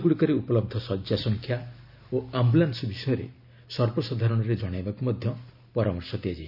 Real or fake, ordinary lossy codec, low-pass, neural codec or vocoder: real; none; 5.4 kHz; none